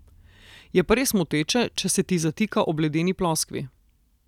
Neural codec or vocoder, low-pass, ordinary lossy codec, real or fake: none; 19.8 kHz; none; real